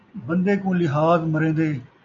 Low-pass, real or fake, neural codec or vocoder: 7.2 kHz; real; none